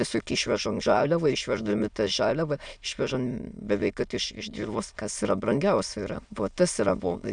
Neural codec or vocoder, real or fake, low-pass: autoencoder, 22.05 kHz, a latent of 192 numbers a frame, VITS, trained on many speakers; fake; 9.9 kHz